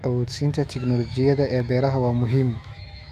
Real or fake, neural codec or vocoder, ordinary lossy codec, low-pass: fake; autoencoder, 48 kHz, 128 numbers a frame, DAC-VAE, trained on Japanese speech; none; 14.4 kHz